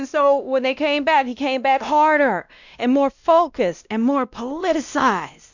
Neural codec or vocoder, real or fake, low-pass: codec, 16 kHz, 1 kbps, X-Codec, WavLM features, trained on Multilingual LibriSpeech; fake; 7.2 kHz